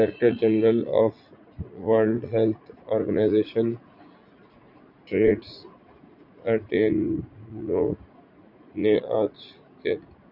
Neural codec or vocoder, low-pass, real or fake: vocoder, 44.1 kHz, 80 mel bands, Vocos; 5.4 kHz; fake